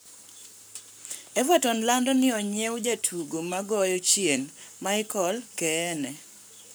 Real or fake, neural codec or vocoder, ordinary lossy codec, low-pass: fake; codec, 44.1 kHz, 7.8 kbps, Pupu-Codec; none; none